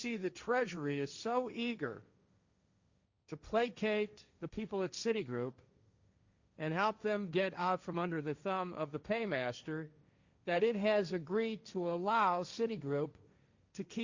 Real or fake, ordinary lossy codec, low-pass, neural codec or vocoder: fake; Opus, 64 kbps; 7.2 kHz; codec, 16 kHz, 1.1 kbps, Voila-Tokenizer